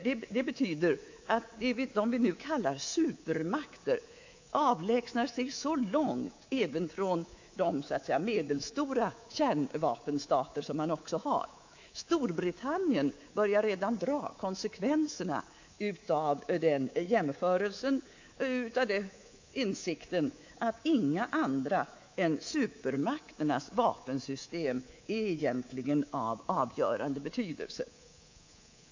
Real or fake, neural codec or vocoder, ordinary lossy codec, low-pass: fake; codec, 24 kHz, 3.1 kbps, DualCodec; AAC, 48 kbps; 7.2 kHz